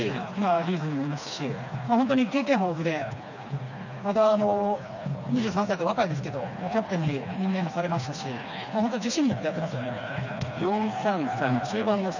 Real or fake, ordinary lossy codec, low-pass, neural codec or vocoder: fake; none; 7.2 kHz; codec, 16 kHz, 2 kbps, FreqCodec, smaller model